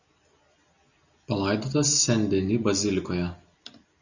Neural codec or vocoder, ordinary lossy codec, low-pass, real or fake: none; Opus, 64 kbps; 7.2 kHz; real